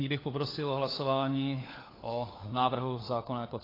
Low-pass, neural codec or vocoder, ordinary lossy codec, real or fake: 5.4 kHz; codec, 16 kHz, 4 kbps, FunCodec, trained on LibriTTS, 50 frames a second; AAC, 24 kbps; fake